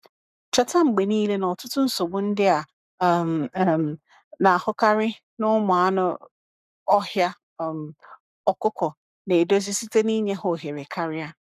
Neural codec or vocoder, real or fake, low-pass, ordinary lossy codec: codec, 44.1 kHz, 7.8 kbps, Pupu-Codec; fake; 14.4 kHz; none